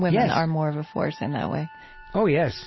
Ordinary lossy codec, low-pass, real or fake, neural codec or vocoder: MP3, 24 kbps; 7.2 kHz; real; none